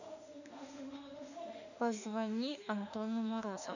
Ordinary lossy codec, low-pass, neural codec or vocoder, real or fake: none; 7.2 kHz; autoencoder, 48 kHz, 32 numbers a frame, DAC-VAE, trained on Japanese speech; fake